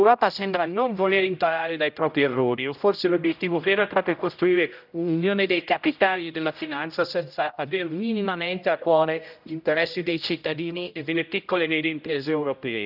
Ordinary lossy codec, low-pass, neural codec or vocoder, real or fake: none; 5.4 kHz; codec, 16 kHz, 0.5 kbps, X-Codec, HuBERT features, trained on general audio; fake